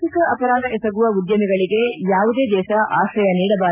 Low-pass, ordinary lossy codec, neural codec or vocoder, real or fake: 3.6 kHz; none; none; real